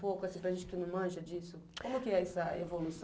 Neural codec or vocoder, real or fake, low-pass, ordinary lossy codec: none; real; none; none